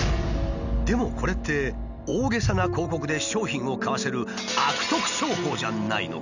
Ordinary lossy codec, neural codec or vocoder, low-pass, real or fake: none; none; 7.2 kHz; real